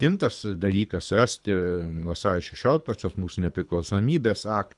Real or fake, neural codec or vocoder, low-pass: fake; codec, 24 kHz, 3 kbps, HILCodec; 10.8 kHz